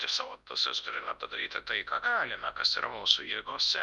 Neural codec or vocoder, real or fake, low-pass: codec, 24 kHz, 0.9 kbps, WavTokenizer, large speech release; fake; 10.8 kHz